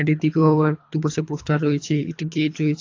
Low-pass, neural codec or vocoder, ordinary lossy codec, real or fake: 7.2 kHz; codec, 24 kHz, 3 kbps, HILCodec; AAC, 48 kbps; fake